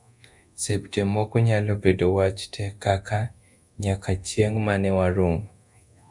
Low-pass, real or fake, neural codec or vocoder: 10.8 kHz; fake; codec, 24 kHz, 0.9 kbps, DualCodec